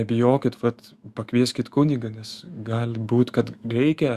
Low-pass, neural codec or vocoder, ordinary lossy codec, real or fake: 14.4 kHz; autoencoder, 48 kHz, 128 numbers a frame, DAC-VAE, trained on Japanese speech; Opus, 64 kbps; fake